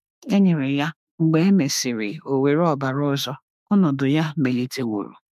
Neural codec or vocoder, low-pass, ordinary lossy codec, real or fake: autoencoder, 48 kHz, 32 numbers a frame, DAC-VAE, trained on Japanese speech; 14.4 kHz; MP3, 96 kbps; fake